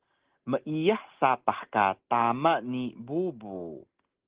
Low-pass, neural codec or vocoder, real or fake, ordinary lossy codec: 3.6 kHz; none; real; Opus, 16 kbps